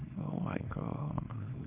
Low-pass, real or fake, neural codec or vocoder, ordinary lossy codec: 3.6 kHz; fake; codec, 24 kHz, 0.9 kbps, WavTokenizer, small release; Opus, 32 kbps